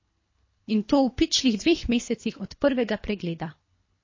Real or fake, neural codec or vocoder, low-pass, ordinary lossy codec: fake; codec, 24 kHz, 3 kbps, HILCodec; 7.2 kHz; MP3, 32 kbps